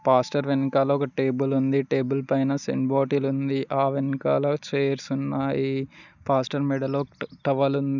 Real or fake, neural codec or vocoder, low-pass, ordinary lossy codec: real; none; 7.2 kHz; none